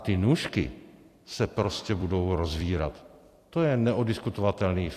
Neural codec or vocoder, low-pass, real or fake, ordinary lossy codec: vocoder, 44.1 kHz, 128 mel bands every 256 samples, BigVGAN v2; 14.4 kHz; fake; AAC, 64 kbps